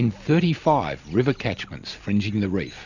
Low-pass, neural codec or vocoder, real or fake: 7.2 kHz; none; real